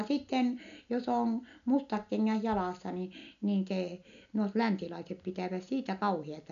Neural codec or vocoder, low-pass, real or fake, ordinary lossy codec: none; 7.2 kHz; real; none